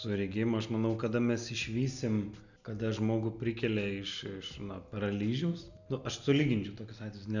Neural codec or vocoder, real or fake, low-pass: none; real; 7.2 kHz